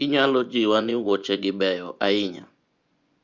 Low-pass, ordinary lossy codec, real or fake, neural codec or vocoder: 7.2 kHz; Opus, 64 kbps; fake; vocoder, 24 kHz, 100 mel bands, Vocos